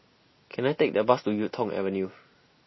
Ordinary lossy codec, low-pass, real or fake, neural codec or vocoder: MP3, 24 kbps; 7.2 kHz; real; none